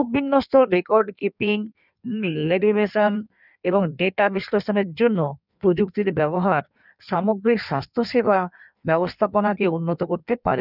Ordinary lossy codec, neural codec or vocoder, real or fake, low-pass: none; codec, 16 kHz in and 24 kHz out, 1.1 kbps, FireRedTTS-2 codec; fake; 5.4 kHz